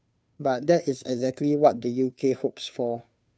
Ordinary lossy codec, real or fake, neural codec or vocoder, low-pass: none; fake; codec, 16 kHz, 2 kbps, FunCodec, trained on Chinese and English, 25 frames a second; none